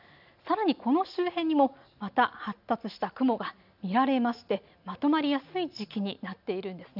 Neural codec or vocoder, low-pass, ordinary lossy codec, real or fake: none; 5.4 kHz; none; real